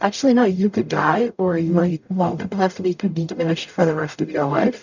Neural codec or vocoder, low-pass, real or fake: codec, 44.1 kHz, 0.9 kbps, DAC; 7.2 kHz; fake